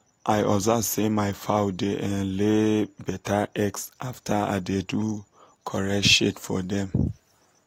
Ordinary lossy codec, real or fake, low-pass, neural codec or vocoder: AAC, 48 kbps; real; 19.8 kHz; none